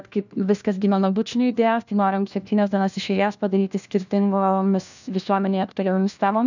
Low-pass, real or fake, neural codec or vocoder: 7.2 kHz; fake; codec, 16 kHz, 1 kbps, FunCodec, trained on LibriTTS, 50 frames a second